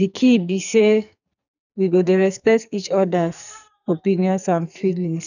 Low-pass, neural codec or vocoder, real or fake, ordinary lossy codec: 7.2 kHz; codec, 44.1 kHz, 2.6 kbps, SNAC; fake; none